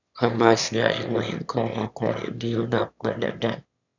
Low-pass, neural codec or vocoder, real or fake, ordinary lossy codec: 7.2 kHz; autoencoder, 22.05 kHz, a latent of 192 numbers a frame, VITS, trained on one speaker; fake; none